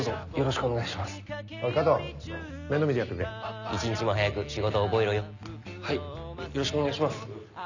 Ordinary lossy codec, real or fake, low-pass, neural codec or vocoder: none; real; 7.2 kHz; none